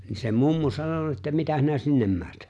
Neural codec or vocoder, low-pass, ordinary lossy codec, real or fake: none; none; none; real